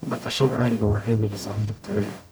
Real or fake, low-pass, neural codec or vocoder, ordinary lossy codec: fake; none; codec, 44.1 kHz, 0.9 kbps, DAC; none